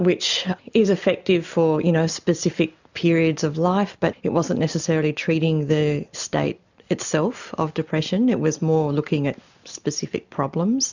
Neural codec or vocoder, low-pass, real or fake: none; 7.2 kHz; real